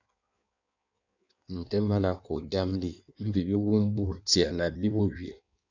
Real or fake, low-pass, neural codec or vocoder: fake; 7.2 kHz; codec, 16 kHz in and 24 kHz out, 1.1 kbps, FireRedTTS-2 codec